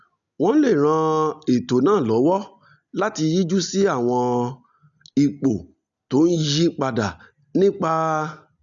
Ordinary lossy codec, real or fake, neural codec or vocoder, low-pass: none; real; none; 7.2 kHz